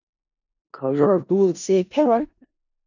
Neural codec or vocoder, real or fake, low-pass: codec, 16 kHz in and 24 kHz out, 0.4 kbps, LongCat-Audio-Codec, four codebook decoder; fake; 7.2 kHz